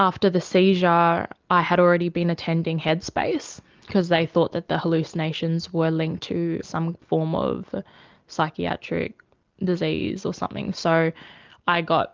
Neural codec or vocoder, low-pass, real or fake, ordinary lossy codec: none; 7.2 kHz; real; Opus, 24 kbps